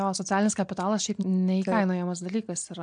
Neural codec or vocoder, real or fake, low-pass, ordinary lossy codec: none; real; 9.9 kHz; MP3, 64 kbps